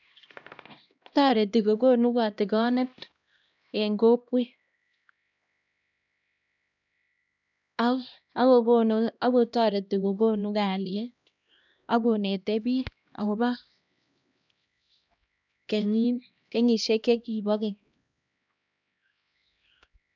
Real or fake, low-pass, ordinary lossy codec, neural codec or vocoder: fake; 7.2 kHz; none; codec, 16 kHz, 1 kbps, X-Codec, HuBERT features, trained on LibriSpeech